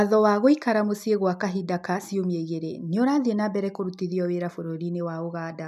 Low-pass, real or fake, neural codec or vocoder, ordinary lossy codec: 14.4 kHz; real; none; none